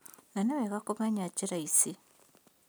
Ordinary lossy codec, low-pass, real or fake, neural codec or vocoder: none; none; real; none